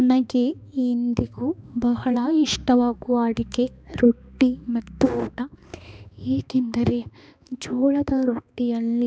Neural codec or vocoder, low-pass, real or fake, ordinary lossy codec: codec, 16 kHz, 2 kbps, X-Codec, HuBERT features, trained on balanced general audio; none; fake; none